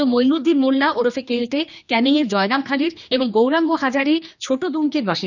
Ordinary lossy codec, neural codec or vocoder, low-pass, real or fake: none; codec, 16 kHz, 2 kbps, X-Codec, HuBERT features, trained on general audio; 7.2 kHz; fake